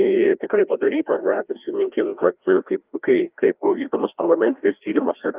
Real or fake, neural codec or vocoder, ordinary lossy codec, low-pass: fake; codec, 16 kHz, 1 kbps, FreqCodec, larger model; Opus, 32 kbps; 3.6 kHz